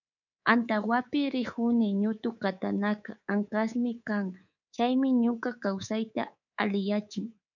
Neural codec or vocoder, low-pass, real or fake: codec, 24 kHz, 3.1 kbps, DualCodec; 7.2 kHz; fake